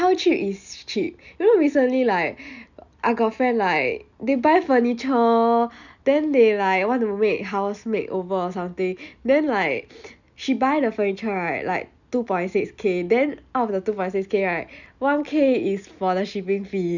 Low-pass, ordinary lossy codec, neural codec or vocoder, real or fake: 7.2 kHz; none; none; real